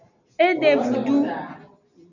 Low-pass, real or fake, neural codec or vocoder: 7.2 kHz; real; none